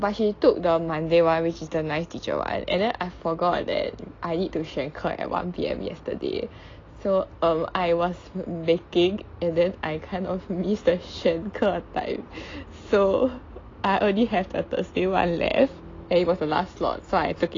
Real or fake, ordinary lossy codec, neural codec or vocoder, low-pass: real; AAC, 32 kbps; none; 7.2 kHz